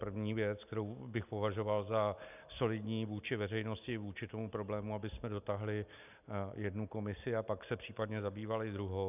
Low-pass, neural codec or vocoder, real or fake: 3.6 kHz; none; real